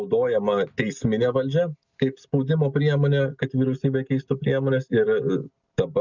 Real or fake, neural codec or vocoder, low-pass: real; none; 7.2 kHz